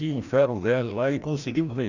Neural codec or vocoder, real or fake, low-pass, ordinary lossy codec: codec, 16 kHz, 1 kbps, FreqCodec, larger model; fake; 7.2 kHz; none